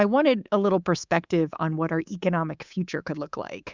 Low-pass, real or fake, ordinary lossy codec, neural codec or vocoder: 7.2 kHz; fake; Opus, 64 kbps; codec, 24 kHz, 3.1 kbps, DualCodec